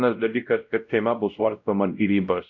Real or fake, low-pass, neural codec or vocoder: fake; 7.2 kHz; codec, 16 kHz, 0.5 kbps, X-Codec, WavLM features, trained on Multilingual LibriSpeech